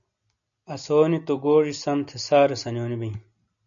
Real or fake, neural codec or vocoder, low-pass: real; none; 7.2 kHz